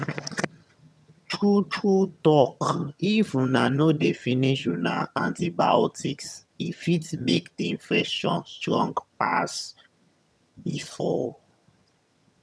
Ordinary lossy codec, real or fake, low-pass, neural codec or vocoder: none; fake; none; vocoder, 22.05 kHz, 80 mel bands, HiFi-GAN